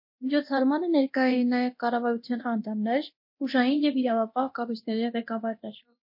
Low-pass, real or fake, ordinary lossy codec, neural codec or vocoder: 5.4 kHz; fake; MP3, 32 kbps; codec, 24 kHz, 0.9 kbps, DualCodec